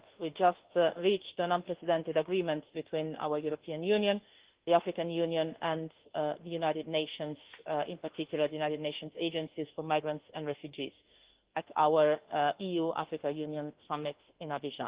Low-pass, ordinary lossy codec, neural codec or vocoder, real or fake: 3.6 kHz; Opus, 16 kbps; autoencoder, 48 kHz, 32 numbers a frame, DAC-VAE, trained on Japanese speech; fake